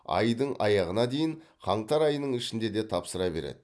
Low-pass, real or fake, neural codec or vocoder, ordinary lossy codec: none; real; none; none